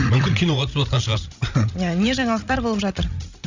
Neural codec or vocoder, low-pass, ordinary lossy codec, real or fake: codec, 16 kHz, 16 kbps, FreqCodec, larger model; 7.2 kHz; Opus, 64 kbps; fake